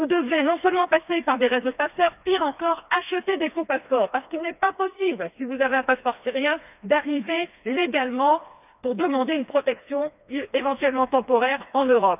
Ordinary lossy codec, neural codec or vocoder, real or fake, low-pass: none; codec, 16 kHz, 2 kbps, FreqCodec, smaller model; fake; 3.6 kHz